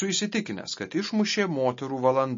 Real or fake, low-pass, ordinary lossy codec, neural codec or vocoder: real; 7.2 kHz; MP3, 32 kbps; none